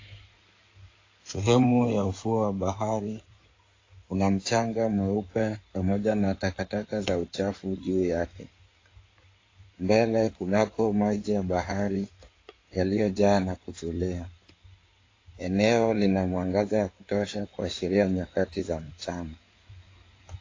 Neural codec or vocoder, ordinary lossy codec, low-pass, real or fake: codec, 16 kHz in and 24 kHz out, 2.2 kbps, FireRedTTS-2 codec; AAC, 32 kbps; 7.2 kHz; fake